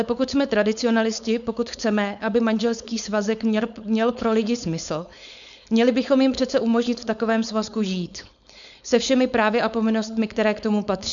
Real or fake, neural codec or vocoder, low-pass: fake; codec, 16 kHz, 4.8 kbps, FACodec; 7.2 kHz